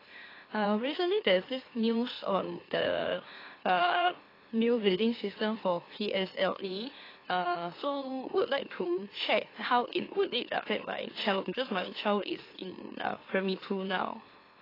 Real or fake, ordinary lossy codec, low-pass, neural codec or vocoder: fake; AAC, 24 kbps; 5.4 kHz; autoencoder, 44.1 kHz, a latent of 192 numbers a frame, MeloTTS